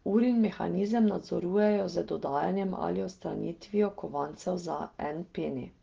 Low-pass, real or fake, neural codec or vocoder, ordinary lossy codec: 7.2 kHz; real; none; Opus, 16 kbps